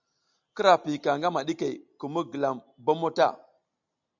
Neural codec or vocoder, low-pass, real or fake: none; 7.2 kHz; real